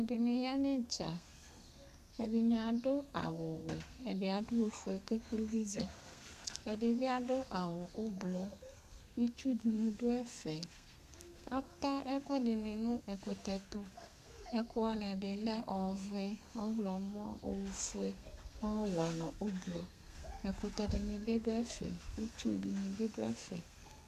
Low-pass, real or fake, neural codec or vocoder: 14.4 kHz; fake; codec, 32 kHz, 1.9 kbps, SNAC